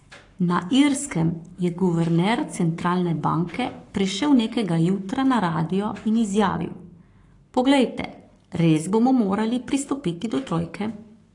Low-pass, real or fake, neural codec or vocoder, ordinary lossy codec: 10.8 kHz; fake; codec, 44.1 kHz, 7.8 kbps, Pupu-Codec; AAC, 48 kbps